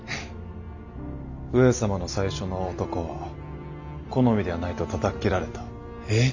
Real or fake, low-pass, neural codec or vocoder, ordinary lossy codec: real; 7.2 kHz; none; none